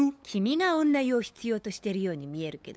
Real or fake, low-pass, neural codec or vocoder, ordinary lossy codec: fake; none; codec, 16 kHz, 8 kbps, FunCodec, trained on LibriTTS, 25 frames a second; none